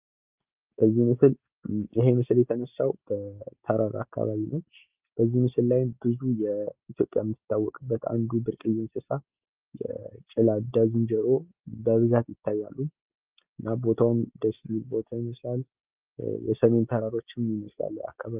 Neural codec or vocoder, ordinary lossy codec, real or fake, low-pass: none; Opus, 24 kbps; real; 3.6 kHz